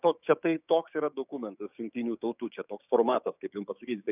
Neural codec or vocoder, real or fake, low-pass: codec, 16 kHz, 6 kbps, DAC; fake; 3.6 kHz